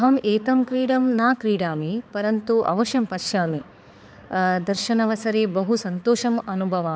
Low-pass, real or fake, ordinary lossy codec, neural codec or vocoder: none; fake; none; codec, 16 kHz, 4 kbps, X-Codec, HuBERT features, trained on balanced general audio